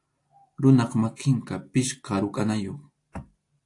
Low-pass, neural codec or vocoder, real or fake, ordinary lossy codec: 10.8 kHz; none; real; AAC, 48 kbps